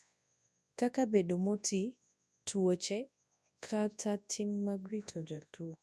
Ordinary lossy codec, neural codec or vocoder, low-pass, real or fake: none; codec, 24 kHz, 0.9 kbps, WavTokenizer, large speech release; none; fake